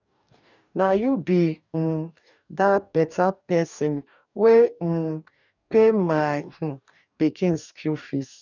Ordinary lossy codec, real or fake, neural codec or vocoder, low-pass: none; fake; codec, 44.1 kHz, 2.6 kbps, DAC; 7.2 kHz